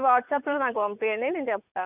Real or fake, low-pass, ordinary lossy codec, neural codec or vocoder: fake; 3.6 kHz; none; vocoder, 44.1 kHz, 80 mel bands, Vocos